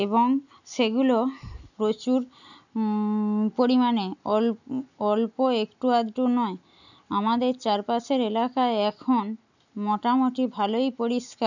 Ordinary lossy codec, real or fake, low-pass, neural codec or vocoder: none; real; 7.2 kHz; none